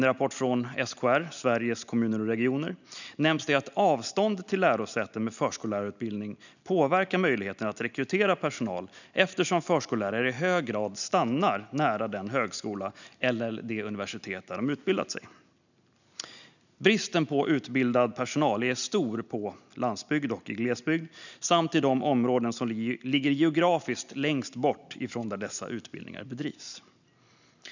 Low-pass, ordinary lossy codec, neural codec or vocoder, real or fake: 7.2 kHz; none; none; real